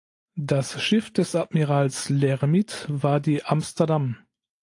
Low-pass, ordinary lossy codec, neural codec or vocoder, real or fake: 10.8 kHz; AAC, 48 kbps; none; real